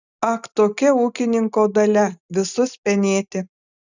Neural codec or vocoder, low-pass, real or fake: none; 7.2 kHz; real